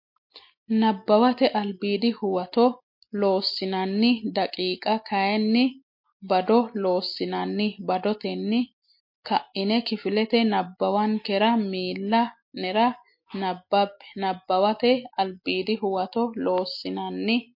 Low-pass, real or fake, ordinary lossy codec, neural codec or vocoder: 5.4 kHz; real; MP3, 32 kbps; none